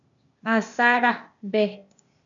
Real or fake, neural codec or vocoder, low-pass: fake; codec, 16 kHz, 0.8 kbps, ZipCodec; 7.2 kHz